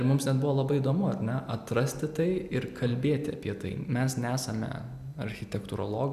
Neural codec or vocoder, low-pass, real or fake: none; 14.4 kHz; real